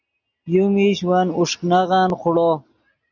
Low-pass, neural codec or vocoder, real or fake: 7.2 kHz; none; real